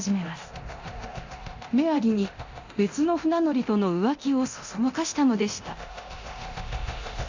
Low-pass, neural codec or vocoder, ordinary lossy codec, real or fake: 7.2 kHz; codec, 24 kHz, 0.9 kbps, DualCodec; Opus, 64 kbps; fake